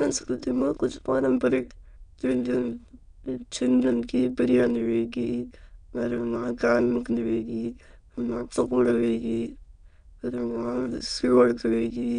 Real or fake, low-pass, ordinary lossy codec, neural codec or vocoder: fake; 9.9 kHz; none; autoencoder, 22.05 kHz, a latent of 192 numbers a frame, VITS, trained on many speakers